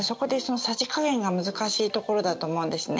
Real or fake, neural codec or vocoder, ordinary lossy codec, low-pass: real; none; none; none